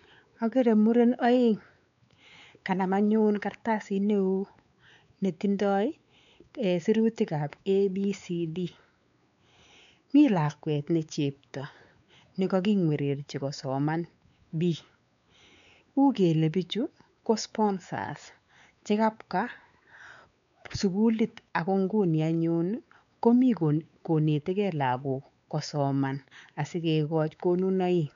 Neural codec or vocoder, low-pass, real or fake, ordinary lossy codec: codec, 16 kHz, 4 kbps, X-Codec, WavLM features, trained on Multilingual LibriSpeech; 7.2 kHz; fake; none